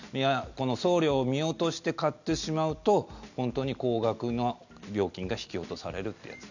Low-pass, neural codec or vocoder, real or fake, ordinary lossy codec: 7.2 kHz; none; real; none